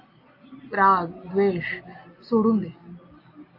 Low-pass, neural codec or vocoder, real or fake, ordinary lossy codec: 5.4 kHz; none; real; AAC, 32 kbps